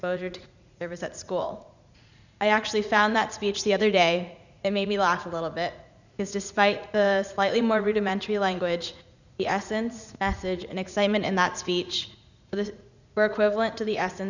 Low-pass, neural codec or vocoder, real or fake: 7.2 kHz; vocoder, 44.1 kHz, 80 mel bands, Vocos; fake